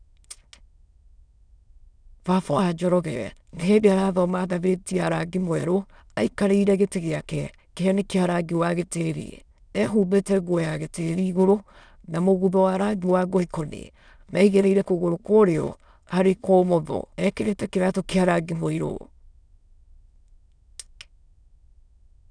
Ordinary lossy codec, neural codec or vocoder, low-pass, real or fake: none; autoencoder, 22.05 kHz, a latent of 192 numbers a frame, VITS, trained on many speakers; 9.9 kHz; fake